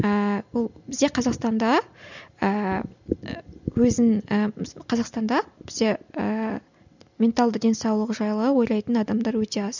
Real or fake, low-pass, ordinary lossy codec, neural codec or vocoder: real; 7.2 kHz; none; none